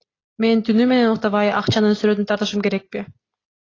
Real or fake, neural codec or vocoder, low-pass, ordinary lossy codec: real; none; 7.2 kHz; AAC, 32 kbps